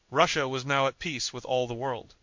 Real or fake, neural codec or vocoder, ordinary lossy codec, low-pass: fake; codec, 16 kHz in and 24 kHz out, 1 kbps, XY-Tokenizer; MP3, 48 kbps; 7.2 kHz